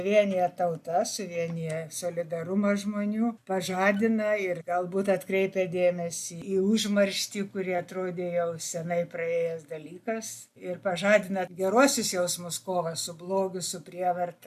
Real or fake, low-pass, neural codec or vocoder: real; 14.4 kHz; none